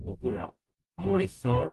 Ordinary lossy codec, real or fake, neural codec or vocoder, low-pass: Opus, 32 kbps; fake; codec, 44.1 kHz, 0.9 kbps, DAC; 14.4 kHz